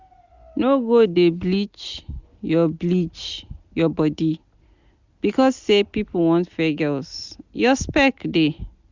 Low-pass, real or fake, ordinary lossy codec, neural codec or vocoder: 7.2 kHz; real; Opus, 64 kbps; none